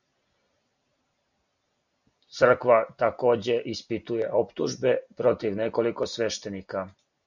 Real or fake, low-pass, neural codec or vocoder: real; 7.2 kHz; none